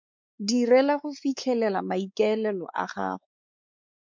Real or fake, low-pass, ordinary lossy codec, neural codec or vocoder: fake; 7.2 kHz; MP3, 64 kbps; codec, 16 kHz, 4 kbps, X-Codec, WavLM features, trained on Multilingual LibriSpeech